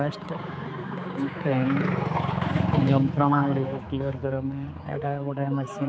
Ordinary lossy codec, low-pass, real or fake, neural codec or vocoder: none; none; fake; codec, 16 kHz, 4 kbps, X-Codec, HuBERT features, trained on general audio